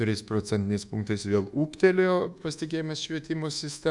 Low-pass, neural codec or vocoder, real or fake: 10.8 kHz; codec, 24 kHz, 1.2 kbps, DualCodec; fake